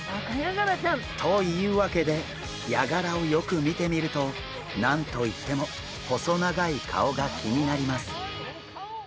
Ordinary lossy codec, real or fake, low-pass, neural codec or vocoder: none; real; none; none